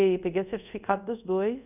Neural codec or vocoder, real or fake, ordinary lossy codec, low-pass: codec, 24 kHz, 0.5 kbps, DualCodec; fake; none; 3.6 kHz